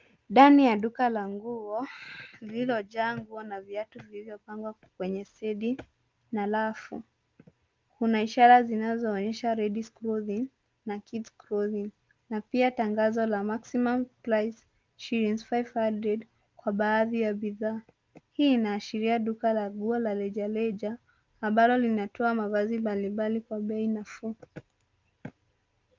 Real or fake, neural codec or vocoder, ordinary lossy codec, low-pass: real; none; Opus, 32 kbps; 7.2 kHz